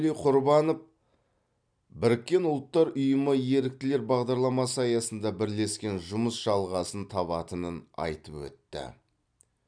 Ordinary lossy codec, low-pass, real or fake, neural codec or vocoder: none; 9.9 kHz; real; none